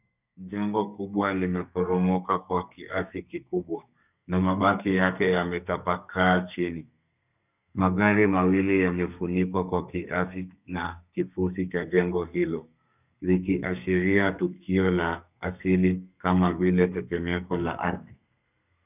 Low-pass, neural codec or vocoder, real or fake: 3.6 kHz; codec, 32 kHz, 1.9 kbps, SNAC; fake